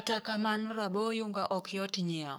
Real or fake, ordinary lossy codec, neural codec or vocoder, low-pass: fake; none; codec, 44.1 kHz, 3.4 kbps, Pupu-Codec; none